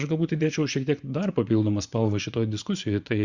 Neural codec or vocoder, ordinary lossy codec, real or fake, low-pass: vocoder, 24 kHz, 100 mel bands, Vocos; Opus, 64 kbps; fake; 7.2 kHz